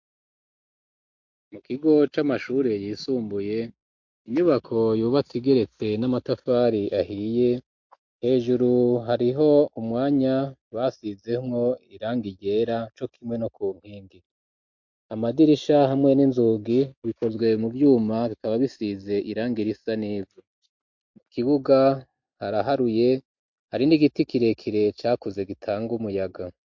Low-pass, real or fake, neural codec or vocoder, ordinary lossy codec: 7.2 kHz; real; none; MP3, 48 kbps